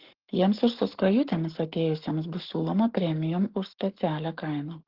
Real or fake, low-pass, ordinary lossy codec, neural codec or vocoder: fake; 5.4 kHz; Opus, 16 kbps; codec, 44.1 kHz, 7.8 kbps, Pupu-Codec